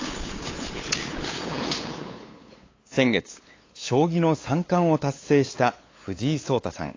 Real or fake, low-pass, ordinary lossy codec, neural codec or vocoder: fake; 7.2 kHz; AAC, 32 kbps; codec, 16 kHz, 8 kbps, FunCodec, trained on LibriTTS, 25 frames a second